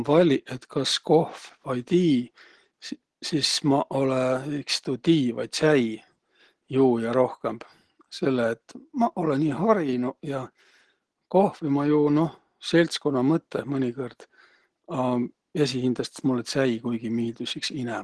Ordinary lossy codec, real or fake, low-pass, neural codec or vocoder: Opus, 16 kbps; real; 10.8 kHz; none